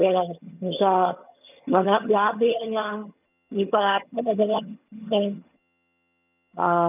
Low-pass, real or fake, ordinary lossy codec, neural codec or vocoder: 3.6 kHz; fake; none; vocoder, 22.05 kHz, 80 mel bands, HiFi-GAN